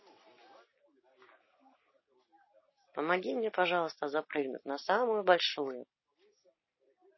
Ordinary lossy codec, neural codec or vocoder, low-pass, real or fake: MP3, 24 kbps; none; 7.2 kHz; real